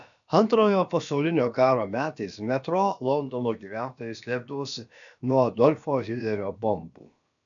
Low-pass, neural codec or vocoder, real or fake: 7.2 kHz; codec, 16 kHz, about 1 kbps, DyCAST, with the encoder's durations; fake